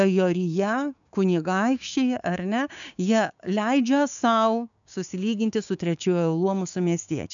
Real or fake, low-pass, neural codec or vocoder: fake; 7.2 kHz; codec, 16 kHz, 6 kbps, DAC